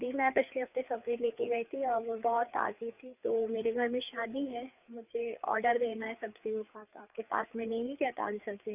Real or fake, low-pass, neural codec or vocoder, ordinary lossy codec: fake; 3.6 kHz; codec, 16 kHz, 4 kbps, FreqCodec, larger model; none